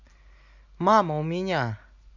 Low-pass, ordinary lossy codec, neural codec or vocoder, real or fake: 7.2 kHz; none; none; real